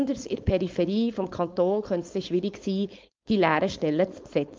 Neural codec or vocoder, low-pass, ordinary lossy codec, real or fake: codec, 16 kHz, 4.8 kbps, FACodec; 7.2 kHz; Opus, 24 kbps; fake